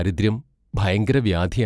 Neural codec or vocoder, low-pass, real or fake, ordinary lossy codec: none; none; real; none